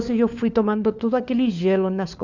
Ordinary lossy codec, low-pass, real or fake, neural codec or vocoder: none; 7.2 kHz; fake; codec, 16 kHz, 4 kbps, FunCodec, trained on LibriTTS, 50 frames a second